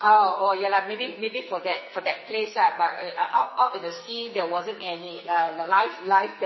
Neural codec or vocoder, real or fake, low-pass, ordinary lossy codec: codec, 44.1 kHz, 2.6 kbps, SNAC; fake; 7.2 kHz; MP3, 24 kbps